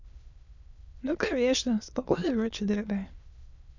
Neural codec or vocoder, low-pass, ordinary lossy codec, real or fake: autoencoder, 22.05 kHz, a latent of 192 numbers a frame, VITS, trained on many speakers; 7.2 kHz; none; fake